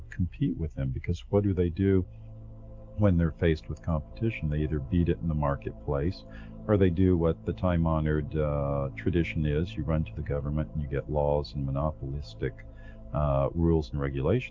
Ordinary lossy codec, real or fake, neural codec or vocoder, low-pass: Opus, 32 kbps; real; none; 7.2 kHz